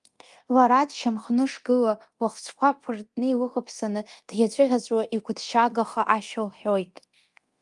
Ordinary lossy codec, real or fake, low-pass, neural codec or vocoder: Opus, 32 kbps; fake; 10.8 kHz; codec, 24 kHz, 0.9 kbps, DualCodec